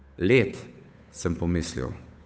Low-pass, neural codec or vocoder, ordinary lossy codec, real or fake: none; codec, 16 kHz, 8 kbps, FunCodec, trained on Chinese and English, 25 frames a second; none; fake